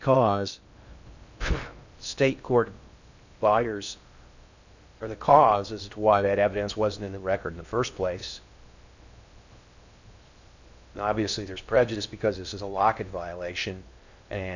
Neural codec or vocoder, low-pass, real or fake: codec, 16 kHz in and 24 kHz out, 0.6 kbps, FocalCodec, streaming, 2048 codes; 7.2 kHz; fake